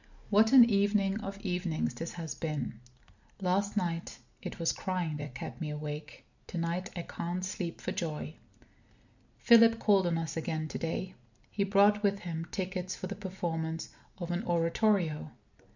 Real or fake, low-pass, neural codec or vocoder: real; 7.2 kHz; none